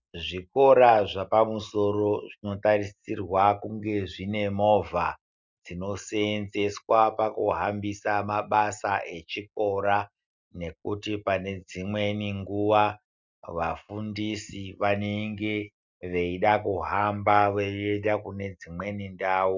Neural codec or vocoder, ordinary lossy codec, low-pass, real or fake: none; Opus, 64 kbps; 7.2 kHz; real